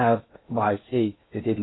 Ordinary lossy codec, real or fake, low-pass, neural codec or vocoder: AAC, 16 kbps; fake; 7.2 kHz; codec, 16 kHz in and 24 kHz out, 0.6 kbps, FocalCodec, streaming, 2048 codes